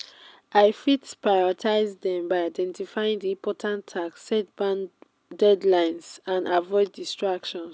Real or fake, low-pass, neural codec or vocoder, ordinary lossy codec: real; none; none; none